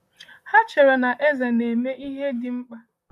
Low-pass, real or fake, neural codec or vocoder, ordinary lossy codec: 14.4 kHz; fake; vocoder, 44.1 kHz, 128 mel bands, Pupu-Vocoder; none